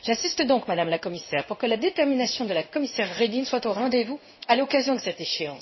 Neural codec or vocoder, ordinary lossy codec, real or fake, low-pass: codec, 16 kHz in and 24 kHz out, 1 kbps, XY-Tokenizer; MP3, 24 kbps; fake; 7.2 kHz